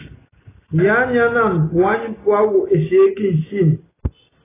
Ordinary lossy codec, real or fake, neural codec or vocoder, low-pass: AAC, 16 kbps; real; none; 3.6 kHz